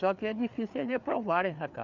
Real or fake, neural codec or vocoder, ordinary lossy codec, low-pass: fake; codec, 16 kHz, 4 kbps, FreqCodec, larger model; none; 7.2 kHz